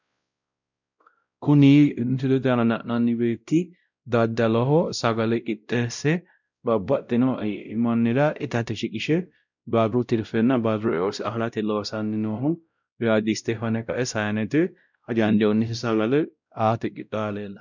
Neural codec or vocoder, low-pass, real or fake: codec, 16 kHz, 0.5 kbps, X-Codec, WavLM features, trained on Multilingual LibriSpeech; 7.2 kHz; fake